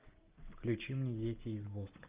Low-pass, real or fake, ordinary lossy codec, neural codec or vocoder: 3.6 kHz; real; Opus, 24 kbps; none